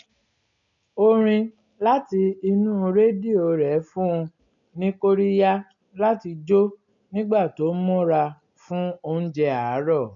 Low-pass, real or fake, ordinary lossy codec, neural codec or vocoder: 7.2 kHz; real; none; none